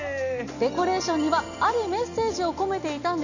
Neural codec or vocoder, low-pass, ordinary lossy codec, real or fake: none; 7.2 kHz; none; real